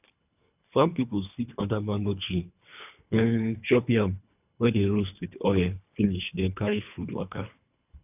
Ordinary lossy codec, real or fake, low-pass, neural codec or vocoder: none; fake; 3.6 kHz; codec, 24 kHz, 3 kbps, HILCodec